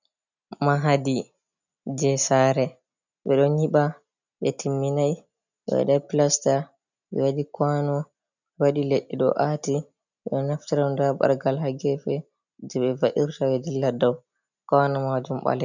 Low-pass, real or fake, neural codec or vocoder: 7.2 kHz; real; none